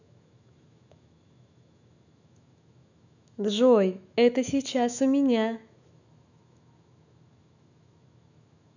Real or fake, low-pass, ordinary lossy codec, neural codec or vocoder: fake; 7.2 kHz; none; autoencoder, 48 kHz, 128 numbers a frame, DAC-VAE, trained on Japanese speech